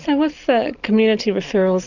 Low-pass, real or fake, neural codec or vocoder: 7.2 kHz; real; none